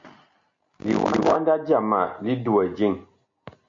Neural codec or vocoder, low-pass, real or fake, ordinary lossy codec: none; 7.2 kHz; real; MP3, 48 kbps